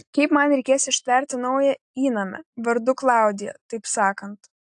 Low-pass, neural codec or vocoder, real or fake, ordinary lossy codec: 10.8 kHz; none; real; AAC, 64 kbps